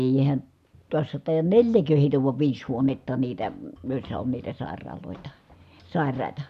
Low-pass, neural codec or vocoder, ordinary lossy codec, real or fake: 14.4 kHz; none; none; real